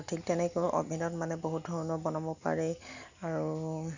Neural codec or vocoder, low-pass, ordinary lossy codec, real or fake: none; 7.2 kHz; none; real